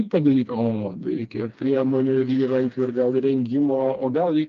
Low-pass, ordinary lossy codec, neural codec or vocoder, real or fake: 7.2 kHz; Opus, 32 kbps; codec, 16 kHz, 2 kbps, FreqCodec, smaller model; fake